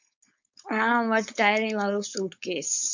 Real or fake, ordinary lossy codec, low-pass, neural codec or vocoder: fake; MP3, 64 kbps; 7.2 kHz; codec, 16 kHz, 4.8 kbps, FACodec